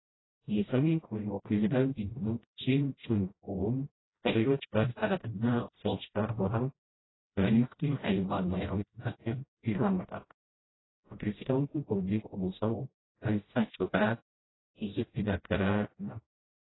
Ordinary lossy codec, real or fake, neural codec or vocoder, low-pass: AAC, 16 kbps; fake; codec, 16 kHz, 0.5 kbps, FreqCodec, smaller model; 7.2 kHz